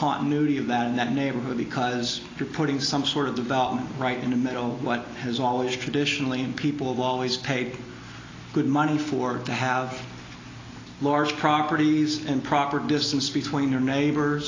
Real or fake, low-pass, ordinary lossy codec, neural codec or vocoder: real; 7.2 kHz; AAC, 32 kbps; none